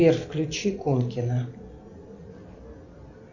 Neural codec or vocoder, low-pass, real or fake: none; 7.2 kHz; real